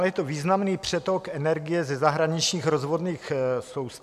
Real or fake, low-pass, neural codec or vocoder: real; 14.4 kHz; none